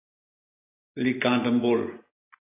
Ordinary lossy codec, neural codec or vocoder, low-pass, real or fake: AAC, 16 kbps; none; 3.6 kHz; real